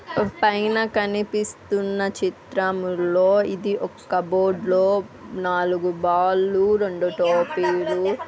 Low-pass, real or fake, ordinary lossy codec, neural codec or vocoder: none; real; none; none